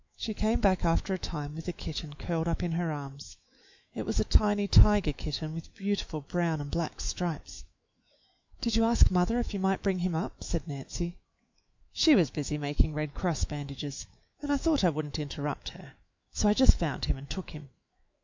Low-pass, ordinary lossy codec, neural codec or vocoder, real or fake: 7.2 kHz; MP3, 64 kbps; autoencoder, 48 kHz, 128 numbers a frame, DAC-VAE, trained on Japanese speech; fake